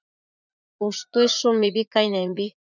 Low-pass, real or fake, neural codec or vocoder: 7.2 kHz; fake; vocoder, 44.1 kHz, 80 mel bands, Vocos